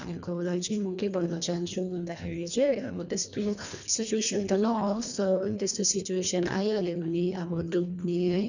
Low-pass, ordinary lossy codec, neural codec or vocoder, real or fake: 7.2 kHz; none; codec, 24 kHz, 1.5 kbps, HILCodec; fake